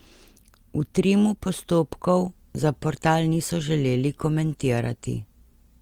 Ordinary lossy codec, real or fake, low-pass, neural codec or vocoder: Opus, 24 kbps; real; 19.8 kHz; none